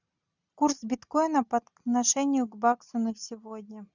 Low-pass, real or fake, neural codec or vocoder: 7.2 kHz; real; none